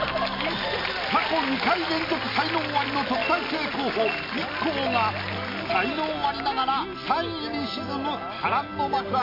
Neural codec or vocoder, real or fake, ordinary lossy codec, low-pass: none; real; MP3, 32 kbps; 5.4 kHz